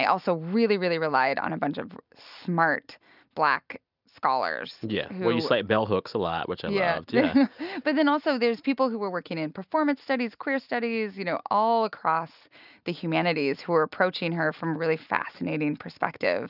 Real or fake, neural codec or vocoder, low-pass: real; none; 5.4 kHz